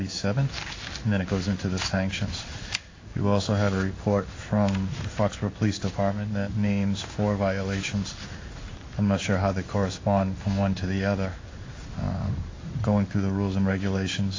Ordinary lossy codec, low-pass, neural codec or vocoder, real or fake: AAC, 32 kbps; 7.2 kHz; codec, 16 kHz in and 24 kHz out, 1 kbps, XY-Tokenizer; fake